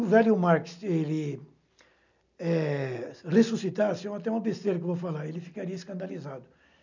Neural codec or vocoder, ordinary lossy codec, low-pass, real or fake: none; none; 7.2 kHz; real